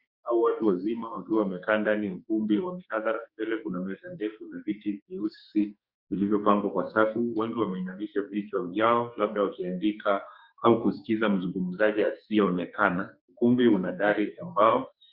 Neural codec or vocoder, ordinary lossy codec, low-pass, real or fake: codec, 44.1 kHz, 2.6 kbps, SNAC; Opus, 64 kbps; 5.4 kHz; fake